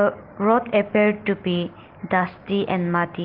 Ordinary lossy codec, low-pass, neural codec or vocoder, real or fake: Opus, 16 kbps; 5.4 kHz; none; real